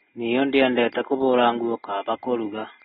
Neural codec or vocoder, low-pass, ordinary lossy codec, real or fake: none; 19.8 kHz; AAC, 16 kbps; real